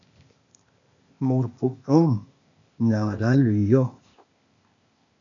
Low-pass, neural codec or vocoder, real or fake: 7.2 kHz; codec, 16 kHz, 0.8 kbps, ZipCodec; fake